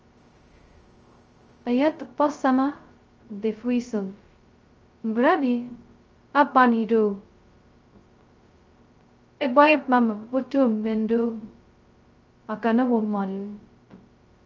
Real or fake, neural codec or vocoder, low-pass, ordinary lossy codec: fake; codec, 16 kHz, 0.2 kbps, FocalCodec; 7.2 kHz; Opus, 24 kbps